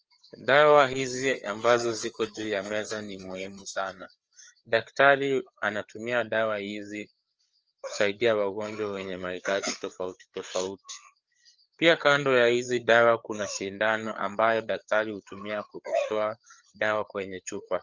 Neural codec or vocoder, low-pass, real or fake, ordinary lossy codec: codec, 16 kHz, 4 kbps, FreqCodec, larger model; 7.2 kHz; fake; Opus, 24 kbps